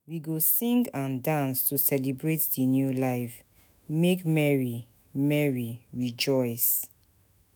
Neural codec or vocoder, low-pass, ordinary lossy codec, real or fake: autoencoder, 48 kHz, 128 numbers a frame, DAC-VAE, trained on Japanese speech; none; none; fake